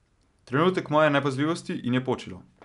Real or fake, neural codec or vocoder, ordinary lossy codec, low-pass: real; none; none; 10.8 kHz